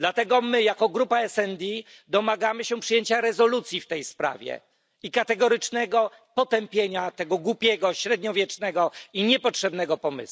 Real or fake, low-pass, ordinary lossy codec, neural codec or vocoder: real; none; none; none